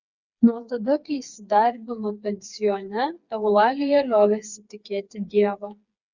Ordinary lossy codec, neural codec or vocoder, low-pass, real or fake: Opus, 64 kbps; codec, 16 kHz, 4 kbps, FreqCodec, smaller model; 7.2 kHz; fake